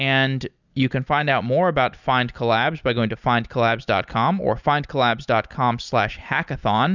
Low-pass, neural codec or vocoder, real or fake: 7.2 kHz; none; real